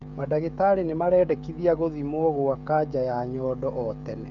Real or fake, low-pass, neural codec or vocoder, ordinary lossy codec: fake; 7.2 kHz; codec, 16 kHz, 16 kbps, FreqCodec, smaller model; none